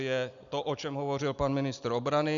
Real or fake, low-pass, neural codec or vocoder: real; 7.2 kHz; none